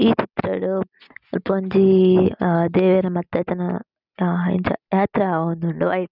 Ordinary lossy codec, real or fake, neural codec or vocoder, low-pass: none; real; none; 5.4 kHz